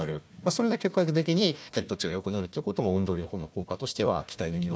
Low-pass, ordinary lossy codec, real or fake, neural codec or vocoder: none; none; fake; codec, 16 kHz, 1 kbps, FunCodec, trained on Chinese and English, 50 frames a second